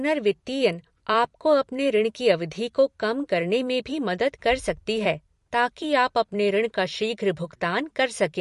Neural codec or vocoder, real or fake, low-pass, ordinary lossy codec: vocoder, 44.1 kHz, 128 mel bands every 256 samples, BigVGAN v2; fake; 14.4 kHz; MP3, 48 kbps